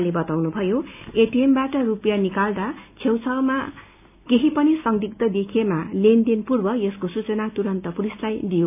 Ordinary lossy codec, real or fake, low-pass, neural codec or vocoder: none; real; 3.6 kHz; none